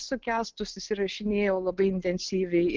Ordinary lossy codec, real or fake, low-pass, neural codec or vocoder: Opus, 16 kbps; real; 7.2 kHz; none